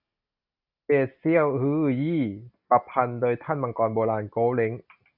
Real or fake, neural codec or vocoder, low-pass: real; none; 5.4 kHz